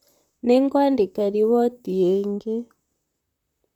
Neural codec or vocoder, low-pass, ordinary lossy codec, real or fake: vocoder, 44.1 kHz, 128 mel bands, Pupu-Vocoder; 19.8 kHz; none; fake